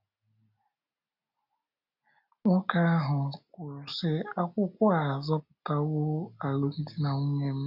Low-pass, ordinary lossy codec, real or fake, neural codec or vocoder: 5.4 kHz; none; real; none